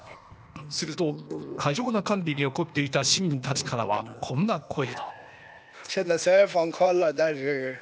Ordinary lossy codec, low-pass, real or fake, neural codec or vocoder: none; none; fake; codec, 16 kHz, 0.8 kbps, ZipCodec